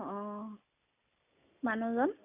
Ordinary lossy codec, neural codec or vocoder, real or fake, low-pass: none; none; real; 3.6 kHz